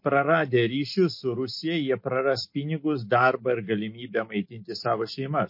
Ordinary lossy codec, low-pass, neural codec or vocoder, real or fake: MP3, 32 kbps; 5.4 kHz; none; real